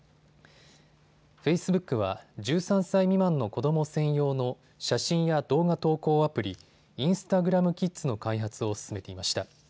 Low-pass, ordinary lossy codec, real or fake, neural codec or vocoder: none; none; real; none